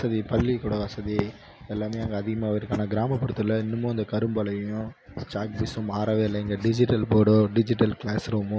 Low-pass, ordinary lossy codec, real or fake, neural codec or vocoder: none; none; real; none